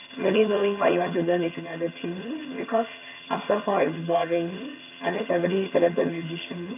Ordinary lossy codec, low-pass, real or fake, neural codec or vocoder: none; 3.6 kHz; fake; vocoder, 22.05 kHz, 80 mel bands, HiFi-GAN